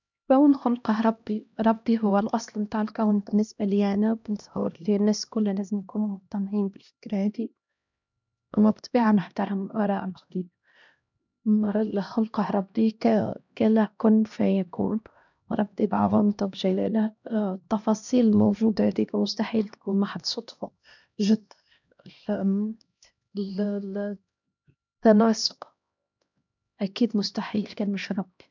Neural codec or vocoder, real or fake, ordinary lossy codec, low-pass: codec, 16 kHz, 1 kbps, X-Codec, HuBERT features, trained on LibriSpeech; fake; none; 7.2 kHz